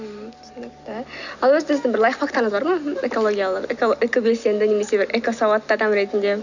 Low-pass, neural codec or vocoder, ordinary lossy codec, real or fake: 7.2 kHz; none; none; real